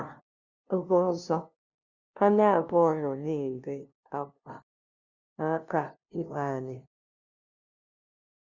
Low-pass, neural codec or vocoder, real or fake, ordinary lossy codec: 7.2 kHz; codec, 16 kHz, 0.5 kbps, FunCodec, trained on LibriTTS, 25 frames a second; fake; Opus, 64 kbps